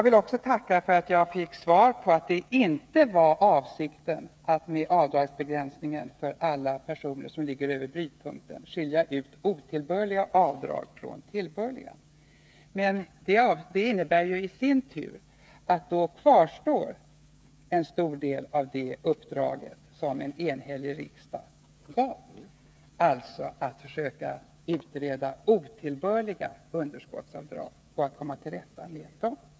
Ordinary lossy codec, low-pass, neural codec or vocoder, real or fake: none; none; codec, 16 kHz, 8 kbps, FreqCodec, smaller model; fake